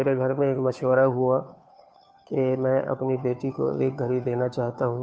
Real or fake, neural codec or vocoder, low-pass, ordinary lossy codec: fake; codec, 16 kHz, 2 kbps, FunCodec, trained on Chinese and English, 25 frames a second; none; none